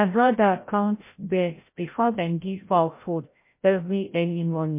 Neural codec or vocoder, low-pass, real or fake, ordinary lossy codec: codec, 16 kHz, 0.5 kbps, FreqCodec, larger model; 3.6 kHz; fake; MP3, 24 kbps